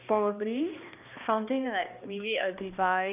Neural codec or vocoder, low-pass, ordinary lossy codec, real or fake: codec, 16 kHz, 1 kbps, X-Codec, HuBERT features, trained on balanced general audio; 3.6 kHz; none; fake